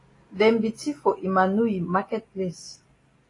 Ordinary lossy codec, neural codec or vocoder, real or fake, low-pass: AAC, 32 kbps; none; real; 10.8 kHz